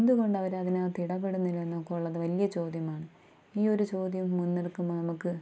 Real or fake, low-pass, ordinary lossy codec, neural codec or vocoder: real; none; none; none